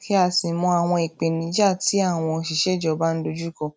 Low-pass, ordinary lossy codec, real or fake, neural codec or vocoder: none; none; real; none